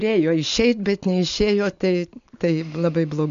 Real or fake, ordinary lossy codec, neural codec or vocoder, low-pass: real; MP3, 64 kbps; none; 7.2 kHz